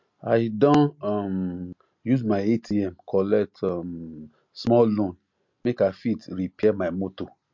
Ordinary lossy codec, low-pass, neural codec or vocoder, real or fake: MP3, 48 kbps; 7.2 kHz; none; real